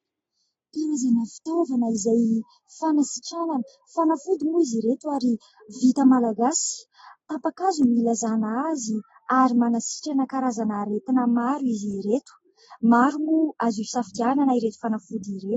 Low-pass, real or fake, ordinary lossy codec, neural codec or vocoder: 19.8 kHz; real; AAC, 24 kbps; none